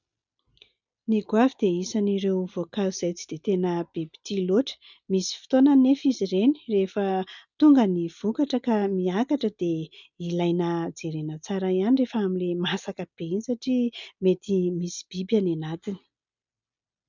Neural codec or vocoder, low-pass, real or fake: none; 7.2 kHz; real